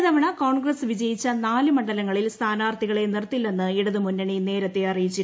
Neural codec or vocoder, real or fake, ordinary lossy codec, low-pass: none; real; none; none